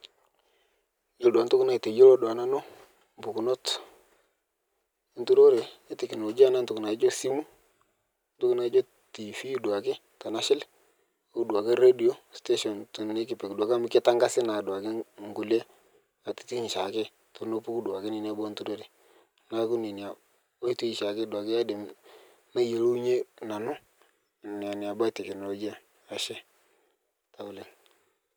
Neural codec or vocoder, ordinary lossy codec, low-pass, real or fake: none; none; none; real